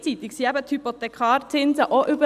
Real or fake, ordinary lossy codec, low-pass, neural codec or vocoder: real; Opus, 64 kbps; 14.4 kHz; none